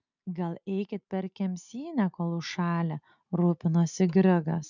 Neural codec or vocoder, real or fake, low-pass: none; real; 7.2 kHz